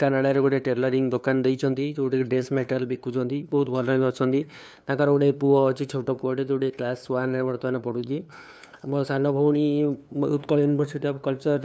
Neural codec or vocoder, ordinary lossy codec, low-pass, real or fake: codec, 16 kHz, 2 kbps, FunCodec, trained on LibriTTS, 25 frames a second; none; none; fake